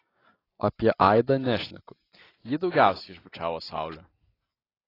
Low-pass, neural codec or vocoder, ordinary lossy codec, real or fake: 5.4 kHz; none; AAC, 24 kbps; real